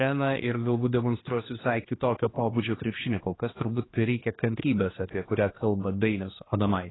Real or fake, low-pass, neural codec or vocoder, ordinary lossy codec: fake; 7.2 kHz; codec, 16 kHz, 2 kbps, X-Codec, HuBERT features, trained on general audio; AAC, 16 kbps